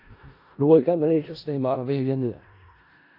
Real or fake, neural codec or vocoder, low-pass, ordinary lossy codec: fake; codec, 16 kHz in and 24 kHz out, 0.4 kbps, LongCat-Audio-Codec, four codebook decoder; 5.4 kHz; AAC, 32 kbps